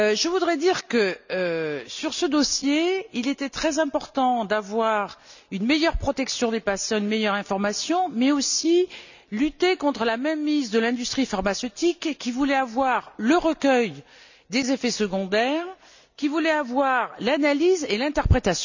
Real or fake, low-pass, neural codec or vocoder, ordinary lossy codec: real; 7.2 kHz; none; none